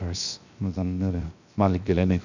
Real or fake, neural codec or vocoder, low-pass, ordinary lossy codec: fake; codec, 16 kHz, 0.7 kbps, FocalCodec; 7.2 kHz; none